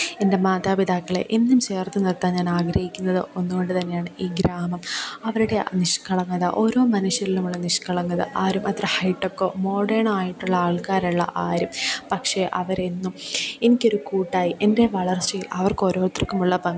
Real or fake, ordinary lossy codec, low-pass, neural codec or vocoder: real; none; none; none